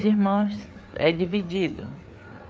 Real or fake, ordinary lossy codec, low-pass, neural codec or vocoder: fake; none; none; codec, 16 kHz, 4 kbps, FreqCodec, larger model